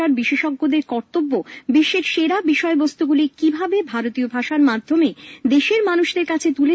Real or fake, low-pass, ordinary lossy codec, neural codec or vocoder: real; none; none; none